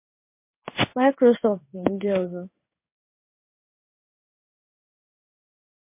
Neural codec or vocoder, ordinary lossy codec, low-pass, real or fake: codec, 16 kHz in and 24 kHz out, 1 kbps, XY-Tokenizer; MP3, 24 kbps; 3.6 kHz; fake